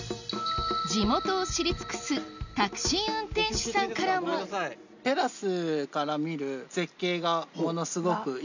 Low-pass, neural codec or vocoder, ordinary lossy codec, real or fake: 7.2 kHz; none; none; real